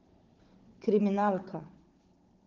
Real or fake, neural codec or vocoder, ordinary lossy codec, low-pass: fake; codec, 16 kHz, 16 kbps, FunCodec, trained on Chinese and English, 50 frames a second; Opus, 16 kbps; 7.2 kHz